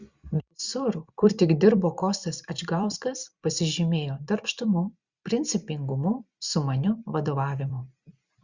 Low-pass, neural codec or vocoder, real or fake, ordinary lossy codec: 7.2 kHz; vocoder, 44.1 kHz, 128 mel bands every 256 samples, BigVGAN v2; fake; Opus, 64 kbps